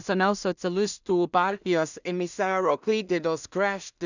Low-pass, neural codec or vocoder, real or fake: 7.2 kHz; codec, 16 kHz in and 24 kHz out, 0.4 kbps, LongCat-Audio-Codec, two codebook decoder; fake